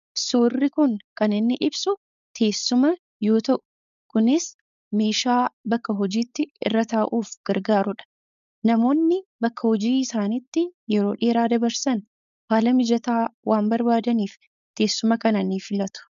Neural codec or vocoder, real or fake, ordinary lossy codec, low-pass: codec, 16 kHz, 4.8 kbps, FACodec; fake; MP3, 96 kbps; 7.2 kHz